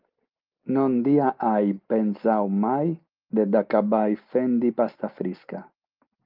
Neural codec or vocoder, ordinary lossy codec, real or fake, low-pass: none; Opus, 24 kbps; real; 5.4 kHz